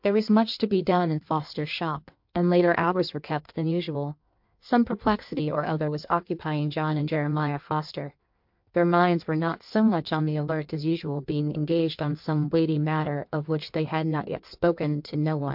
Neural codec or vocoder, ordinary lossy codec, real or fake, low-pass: codec, 16 kHz in and 24 kHz out, 1.1 kbps, FireRedTTS-2 codec; MP3, 48 kbps; fake; 5.4 kHz